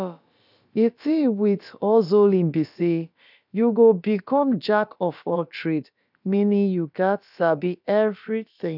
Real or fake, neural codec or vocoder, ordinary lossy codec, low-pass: fake; codec, 16 kHz, about 1 kbps, DyCAST, with the encoder's durations; none; 5.4 kHz